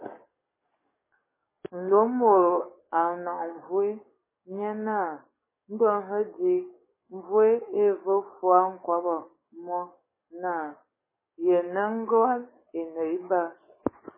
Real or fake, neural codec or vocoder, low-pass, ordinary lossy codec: fake; codec, 44.1 kHz, 7.8 kbps, DAC; 3.6 kHz; MP3, 16 kbps